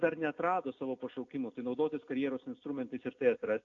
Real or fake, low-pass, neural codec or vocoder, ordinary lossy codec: real; 7.2 kHz; none; AAC, 48 kbps